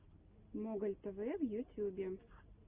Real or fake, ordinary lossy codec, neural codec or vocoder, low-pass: real; Opus, 24 kbps; none; 3.6 kHz